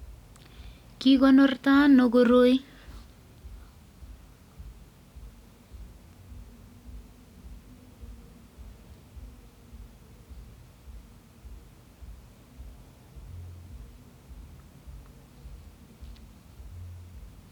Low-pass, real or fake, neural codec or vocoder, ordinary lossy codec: 19.8 kHz; real; none; none